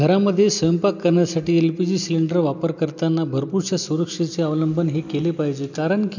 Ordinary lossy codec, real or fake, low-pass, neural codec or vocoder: none; real; 7.2 kHz; none